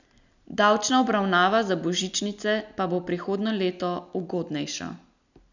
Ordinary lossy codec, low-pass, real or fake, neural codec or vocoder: none; 7.2 kHz; real; none